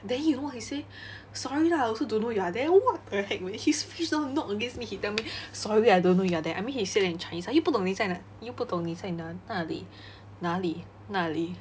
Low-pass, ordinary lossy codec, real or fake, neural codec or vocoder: none; none; real; none